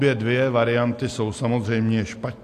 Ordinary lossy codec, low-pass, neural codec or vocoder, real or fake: AAC, 64 kbps; 14.4 kHz; none; real